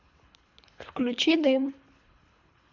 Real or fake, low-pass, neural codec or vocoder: fake; 7.2 kHz; codec, 24 kHz, 3 kbps, HILCodec